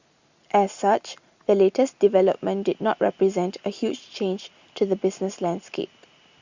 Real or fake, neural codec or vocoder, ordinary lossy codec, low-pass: real; none; Opus, 64 kbps; 7.2 kHz